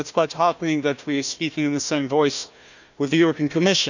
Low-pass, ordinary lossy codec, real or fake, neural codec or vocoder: 7.2 kHz; none; fake; codec, 16 kHz, 1 kbps, FunCodec, trained on Chinese and English, 50 frames a second